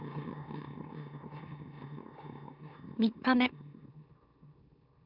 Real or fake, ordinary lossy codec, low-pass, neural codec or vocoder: fake; none; 5.4 kHz; autoencoder, 44.1 kHz, a latent of 192 numbers a frame, MeloTTS